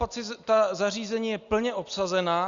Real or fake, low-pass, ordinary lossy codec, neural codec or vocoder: real; 7.2 kHz; Opus, 64 kbps; none